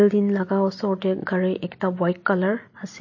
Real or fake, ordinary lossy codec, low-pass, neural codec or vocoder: real; MP3, 32 kbps; 7.2 kHz; none